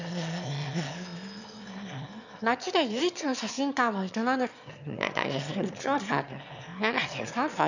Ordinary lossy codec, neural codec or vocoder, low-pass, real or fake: none; autoencoder, 22.05 kHz, a latent of 192 numbers a frame, VITS, trained on one speaker; 7.2 kHz; fake